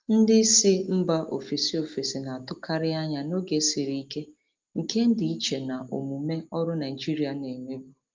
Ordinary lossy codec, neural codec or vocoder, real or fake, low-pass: Opus, 24 kbps; none; real; 7.2 kHz